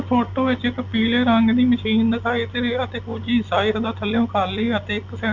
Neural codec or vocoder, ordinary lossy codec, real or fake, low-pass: none; none; real; 7.2 kHz